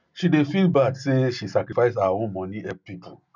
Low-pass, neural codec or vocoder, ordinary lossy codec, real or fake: 7.2 kHz; none; none; real